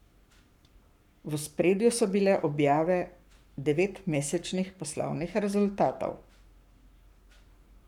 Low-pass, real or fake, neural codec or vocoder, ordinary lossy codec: 19.8 kHz; fake; codec, 44.1 kHz, 7.8 kbps, Pupu-Codec; none